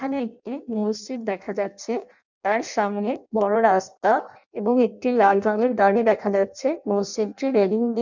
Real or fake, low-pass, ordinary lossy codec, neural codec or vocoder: fake; 7.2 kHz; none; codec, 16 kHz in and 24 kHz out, 0.6 kbps, FireRedTTS-2 codec